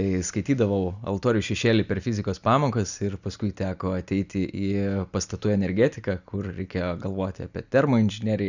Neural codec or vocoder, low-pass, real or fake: none; 7.2 kHz; real